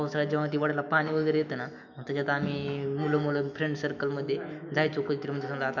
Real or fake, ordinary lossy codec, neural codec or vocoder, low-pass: fake; none; autoencoder, 48 kHz, 128 numbers a frame, DAC-VAE, trained on Japanese speech; 7.2 kHz